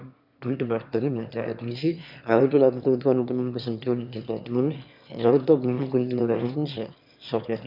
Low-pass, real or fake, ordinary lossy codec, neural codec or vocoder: 5.4 kHz; fake; none; autoencoder, 22.05 kHz, a latent of 192 numbers a frame, VITS, trained on one speaker